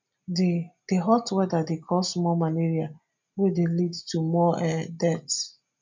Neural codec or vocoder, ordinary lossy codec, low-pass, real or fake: none; MP3, 64 kbps; 7.2 kHz; real